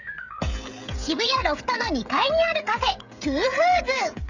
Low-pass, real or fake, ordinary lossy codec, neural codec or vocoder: 7.2 kHz; fake; none; codec, 16 kHz, 16 kbps, FreqCodec, smaller model